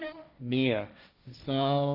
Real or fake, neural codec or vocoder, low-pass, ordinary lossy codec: fake; codec, 16 kHz, 1.1 kbps, Voila-Tokenizer; 5.4 kHz; none